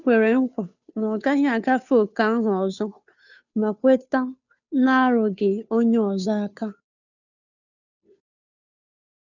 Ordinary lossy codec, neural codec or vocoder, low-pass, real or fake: none; codec, 16 kHz, 2 kbps, FunCodec, trained on Chinese and English, 25 frames a second; 7.2 kHz; fake